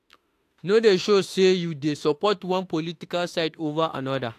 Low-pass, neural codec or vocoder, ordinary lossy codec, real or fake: 14.4 kHz; autoencoder, 48 kHz, 32 numbers a frame, DAC-VAE, trained on Japanese speech; AAC, 64 kbps; fake